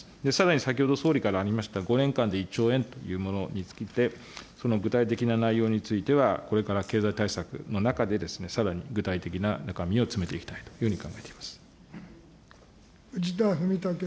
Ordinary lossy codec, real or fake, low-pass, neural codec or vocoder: none; real; none; none